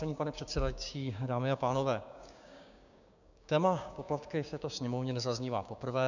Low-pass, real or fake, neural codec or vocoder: 7.2 kHz; fake; codec, 16 kHz, 6 kbps, DAC